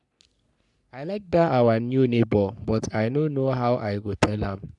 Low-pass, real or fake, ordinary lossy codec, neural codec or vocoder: 10.8 kHz; fake; none; codec, 44.1 kHz, 3.4 kbps, Pupu-Codec